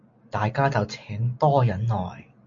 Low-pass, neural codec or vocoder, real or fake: 7.2 kHz; none; real